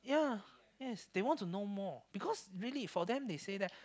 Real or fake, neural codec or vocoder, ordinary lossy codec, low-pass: real; none; none; none